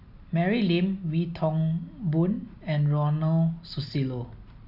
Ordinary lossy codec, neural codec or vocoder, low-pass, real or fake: none; none; 5.4 kHz; real